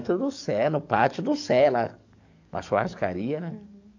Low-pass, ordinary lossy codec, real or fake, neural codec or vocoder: 7.2 kHz; AAC, 48 kbps; fake; codec, 44.1 kHz, 7.8 kbps, DAC